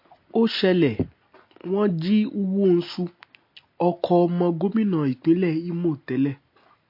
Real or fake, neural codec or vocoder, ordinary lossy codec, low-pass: real; none; MP3, 32 kbps; 5.4 kHz